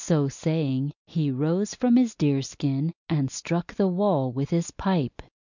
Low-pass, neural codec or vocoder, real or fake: 7.2 kHz; none; real